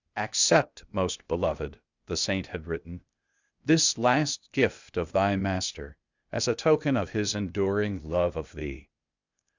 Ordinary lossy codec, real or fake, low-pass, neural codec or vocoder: Opus, 64 kbps; fake; 7.2 kHz; codec, 16 kHz, 0.8 kbps, ZipCodec